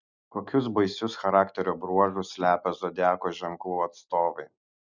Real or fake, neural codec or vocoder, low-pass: real; none; 7.2 kHz